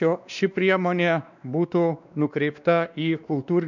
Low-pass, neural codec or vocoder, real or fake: 7.2 kHz; codec, 16 kHz, 2 kbps, X-Codec, WavLM features, trained on Multilingual LibriSpeech; fake